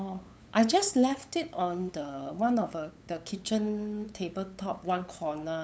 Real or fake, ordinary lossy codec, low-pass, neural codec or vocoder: fake; none; none; codec, 16 kHz, 8 kbps, FunCodec, trained on LibriTTS, 25 frames a second